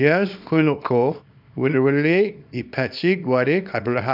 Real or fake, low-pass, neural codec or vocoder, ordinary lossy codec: fake; 5.4 kHz; codec, 24 kHz, 0.9 kbps, WavTokenizer, small release; none